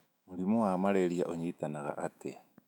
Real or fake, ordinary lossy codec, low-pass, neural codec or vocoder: fake; none; 19.8 kHz; autoencoder, 48 kHz, 128 numbers a frame, DAC-VAE, trained on Japanese speech